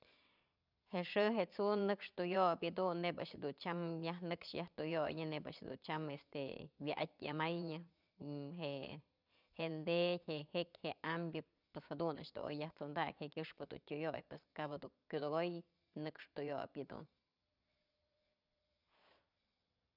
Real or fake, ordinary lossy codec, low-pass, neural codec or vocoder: fake; none; 5.4 kHz; vocoder, 22.05 kHz, 80 mel bands, Vocos